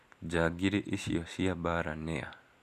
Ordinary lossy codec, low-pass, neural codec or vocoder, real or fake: none; 14.4 kHz; none; real